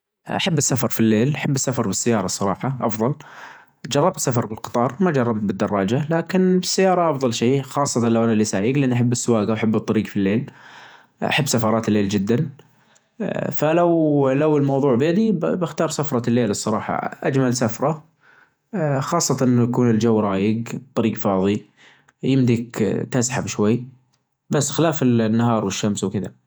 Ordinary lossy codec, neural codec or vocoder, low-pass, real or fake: none; vocoder, 48 kHz, 128 mel bands, Vocos; none; fake